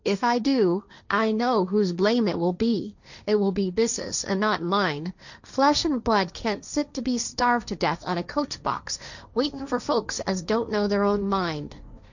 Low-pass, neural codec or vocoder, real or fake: 7.2 kHz; codec, 16 kHz, 1.1 kbps, Voila-Tokenizer; fake